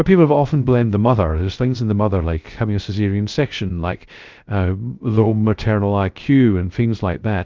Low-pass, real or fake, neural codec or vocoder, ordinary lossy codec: 7.2 kHz; fake; codec, 16 kHz, 0.3 kbps, FocalCodec; Opus, 24 kbps